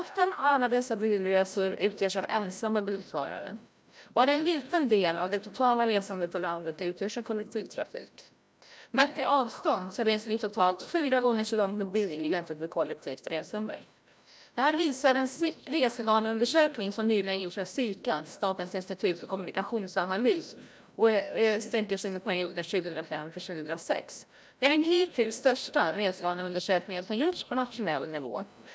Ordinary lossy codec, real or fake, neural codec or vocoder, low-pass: none; fake; codec, 16 kHz, 0.5 kbps, FreqCodec, larger model; none